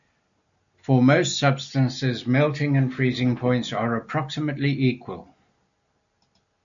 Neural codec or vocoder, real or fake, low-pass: none; real; 7.2 kHz